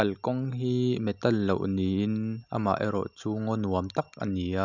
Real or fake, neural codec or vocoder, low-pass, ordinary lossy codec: real; none; 7.2 kHz; none